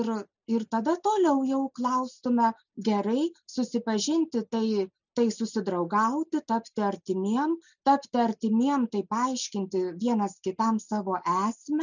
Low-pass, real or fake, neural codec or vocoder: 7.2 kHz; real; none